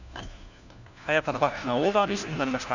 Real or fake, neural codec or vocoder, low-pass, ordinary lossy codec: fake; codec, 16 kHz, 1 kbps, FunCodec, trained on LibriTTS, 50 frames a second; 7.2 kHz; none